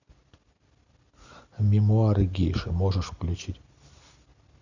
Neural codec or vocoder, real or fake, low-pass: none; real; 7.2 kHz